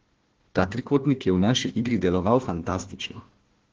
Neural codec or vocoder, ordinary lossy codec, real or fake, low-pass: codec, 16 kHz, 1 kbps, FunCodec, trained on Chinese and English, 50 frames a second; Opus, 16 kbps; fake; 7.2 kHz